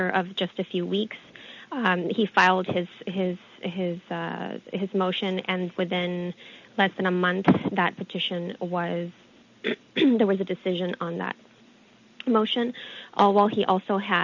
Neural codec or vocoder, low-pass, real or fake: none; 7.2 kHz; real